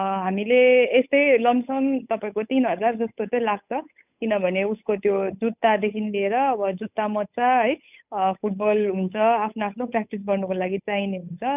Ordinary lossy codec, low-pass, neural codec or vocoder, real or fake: none; 3.6 kHz; codec, 16 kHz, 8 kbps, FunCodec, trained on Chinese and English, 25 frames a second; fake